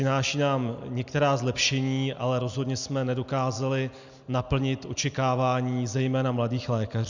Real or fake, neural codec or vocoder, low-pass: real; none; 7.2 kHz